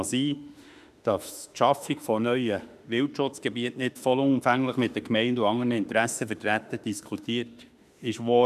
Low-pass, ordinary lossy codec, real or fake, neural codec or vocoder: 14.4 kHz; none; fake; autoencoder, 48 kHz, 32 numbers a frame, DAC-VAE, trained on Japanese speech